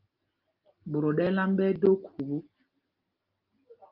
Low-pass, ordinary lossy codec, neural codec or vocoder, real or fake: 5.4 kHz; Opus, 32 kbps; none; real